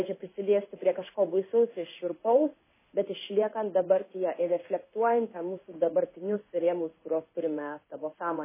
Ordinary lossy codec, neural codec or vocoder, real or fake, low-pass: MP3, 16 kbps; codec, 16 kHz in and 24 kHz out, 1 kbps, XY-Tokenizer; fake; 3.6 kHz